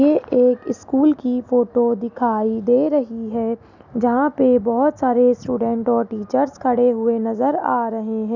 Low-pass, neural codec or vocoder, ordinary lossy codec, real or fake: 7.2 kHz; none; none; real